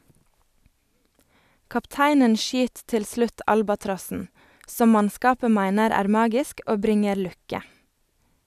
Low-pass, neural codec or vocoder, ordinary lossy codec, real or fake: 14.4 kHz; none; none; real